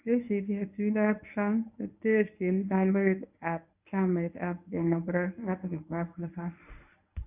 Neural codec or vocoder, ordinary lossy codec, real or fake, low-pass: codec, 24 kHz, 0.9 kbps, WavTokenizer, medium speech release version 1; none; fake; 3.6 kHz